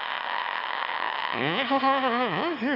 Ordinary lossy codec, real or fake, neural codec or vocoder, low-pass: none; fake; autoencoder, 22.05 kHz, a latent of 192 numbers a frame, VITS, trained on one speaker; 5.4 kHz